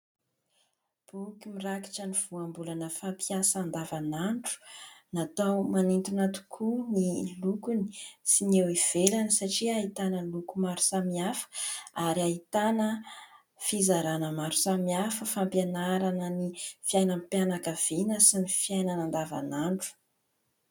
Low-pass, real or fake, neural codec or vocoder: 19.8 kHz; real; none